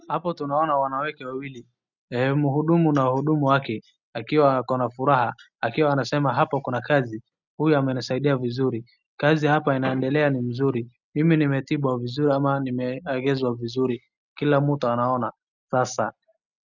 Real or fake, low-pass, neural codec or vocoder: real; 7.2 kHz; none